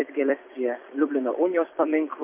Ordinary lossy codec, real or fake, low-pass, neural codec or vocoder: MP3, 24 kbps; fake; 3.6 kHz; vocoder, 44.1 kHz, 128 mel bands every 256 samples, BigVGAN v2